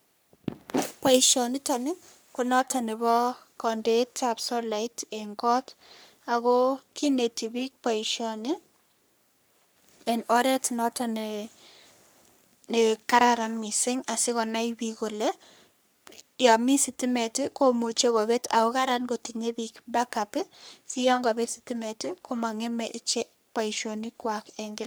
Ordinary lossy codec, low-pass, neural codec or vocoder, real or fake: none; none; codec, 44.1 kHz, 3.4 kbps, Pupu-Codec; fake